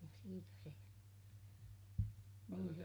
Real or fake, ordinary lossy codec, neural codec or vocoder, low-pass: real; none; none; none